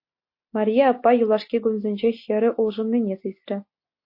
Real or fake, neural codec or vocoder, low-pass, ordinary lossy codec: real; none; 5.4 kHz; MP3, 32 kbps